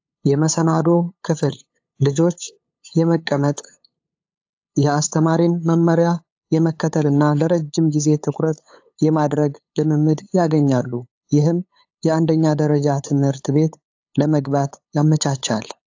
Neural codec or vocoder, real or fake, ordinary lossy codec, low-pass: codec, 16 kHz, 8 kbps, FunCodec, trained on LibriTTS, 25 frames a second; fake; AAC, 48 kbps; 7.2 kHz